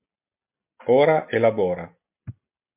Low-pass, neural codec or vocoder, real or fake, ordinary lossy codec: 3.6 kHz; none; real; MP3, 32 kbps